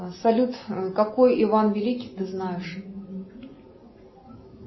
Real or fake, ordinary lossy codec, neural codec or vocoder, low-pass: real; MP3, 24 kbps; none; 7.2 kHz